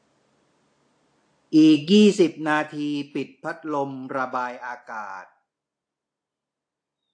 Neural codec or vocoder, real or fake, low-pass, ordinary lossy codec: none; real; 9.9 kHz; AAC, 48 kbps